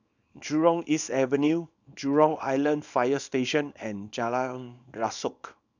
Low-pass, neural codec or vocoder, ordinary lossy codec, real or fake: 7.2 kHz; codec, 24 kHz, 0.9 kbps, WavTokenizer, small release; none; fake